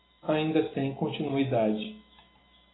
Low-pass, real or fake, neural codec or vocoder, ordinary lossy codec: 7.2 kHz; real; none; AAC, 16 kbps